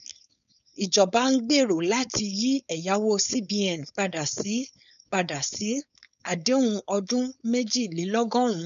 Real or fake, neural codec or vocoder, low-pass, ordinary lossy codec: fake; codec, 16 kHz, 4.8 kbps, FACodec; 7.2 kHz; none